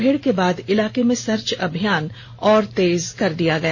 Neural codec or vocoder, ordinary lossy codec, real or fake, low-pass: none; MP3, 32 kbps; real; 7.2 kHz